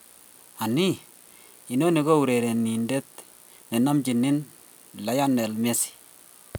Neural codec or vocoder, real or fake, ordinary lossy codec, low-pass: none; real; none; none